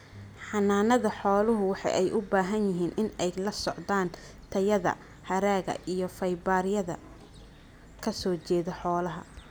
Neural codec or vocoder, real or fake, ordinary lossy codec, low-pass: none; real; none; none